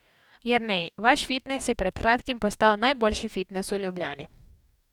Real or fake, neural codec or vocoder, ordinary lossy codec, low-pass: fake; codec, 44.1 kHz, 2.6 kbps, DAC; none; 19.8 kHz